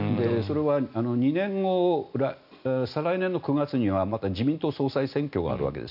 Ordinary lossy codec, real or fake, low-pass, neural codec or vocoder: none; real; 5.4 kHz; none